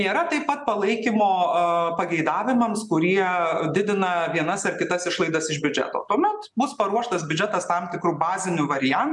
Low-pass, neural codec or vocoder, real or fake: 10.8 kHz; none; real